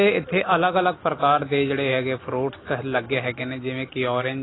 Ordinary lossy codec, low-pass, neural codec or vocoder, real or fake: AAC, 16 kbps; 7.2 kHz; none; real